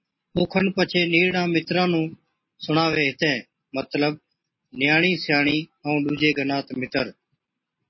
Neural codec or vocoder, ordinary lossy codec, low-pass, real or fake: none; MP3, 24 kbps; 7.2 kHz; real